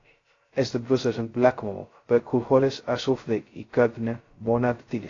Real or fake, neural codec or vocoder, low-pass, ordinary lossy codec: fake; codec, 16 kHz, 0.2 kbps, FocalCodec; 7.2 kHz; AAC, 32 kbps